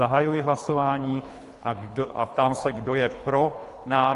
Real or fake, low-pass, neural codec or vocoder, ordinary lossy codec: fake; 10.8 kHz; codec, 24 kHz, 3 kbps, HILCodec; AAC, 48 kbps